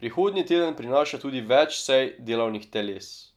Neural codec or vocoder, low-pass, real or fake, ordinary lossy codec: none; 19.8 kHz; real; none